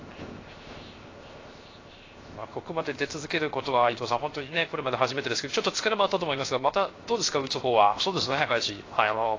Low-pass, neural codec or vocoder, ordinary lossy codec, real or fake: 7.2 kHz; codec, 16 kHz, 0.7 kbps, FocalCodec; AAC, 32 kbps; fake